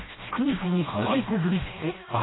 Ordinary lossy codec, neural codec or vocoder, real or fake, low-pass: AAC, 16 kbps; codec, 16 kHz, 1 kbps, FreqCodec, smaller model; fake; 7.2 kHz